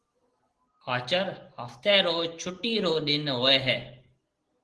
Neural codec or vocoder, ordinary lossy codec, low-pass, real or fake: none; Opus, 16 kbps; 10.8 kHz; real